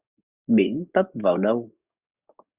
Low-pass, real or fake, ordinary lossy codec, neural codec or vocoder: 3.6 kHz; fake; Opus, 64 kbps; codec, 44.1 kHz, 7.8 kbps, DAC